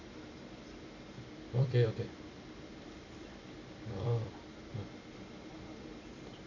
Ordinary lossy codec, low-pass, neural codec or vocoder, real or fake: none; 7.2 kHz; vocoder, 44.1 kHz, 128 mel bands every 256 samples, BigVGAN v2; fake